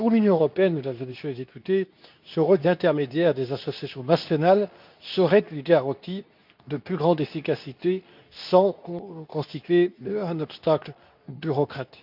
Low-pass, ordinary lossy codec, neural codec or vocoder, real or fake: 5.4 kHz; none; codec, 24 kHz, 0.9 kbps, WavTokenizer, medium speech release version 2; fake